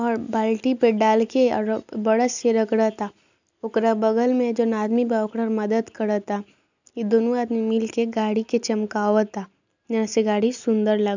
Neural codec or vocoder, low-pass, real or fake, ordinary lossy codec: none; 7.2 kHz; real; none